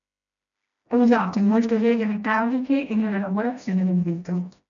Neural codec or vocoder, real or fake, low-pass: codec, 16 kHz, 1 kbps, FreqCodec, smaller model; fake; 7.2 kHz